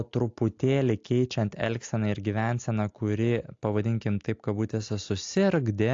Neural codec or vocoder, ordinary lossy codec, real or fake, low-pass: none; AAC, 48 kbps; real; 7.2 kHz